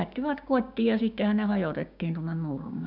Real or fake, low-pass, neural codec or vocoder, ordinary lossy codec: fake; 5.4 kHz; codec, 16 kHz, 6 kbps, DAC; none